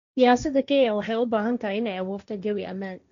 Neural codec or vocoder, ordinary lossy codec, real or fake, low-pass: codec, 16 kHz, 1.1 kbps, Voila-Tokenizer; none; fake; 7.2 kHz